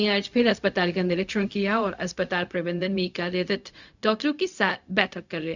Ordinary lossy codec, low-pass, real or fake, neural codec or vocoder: none; 7.2 kHz; fake; codec, 16 kHz, 0.4 kbps, LongCat-Audio-Codec